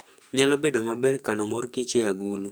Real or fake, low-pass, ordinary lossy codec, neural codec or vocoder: fake; none; none; codec, 44.1 kHz, 2.6 kbps, SNAC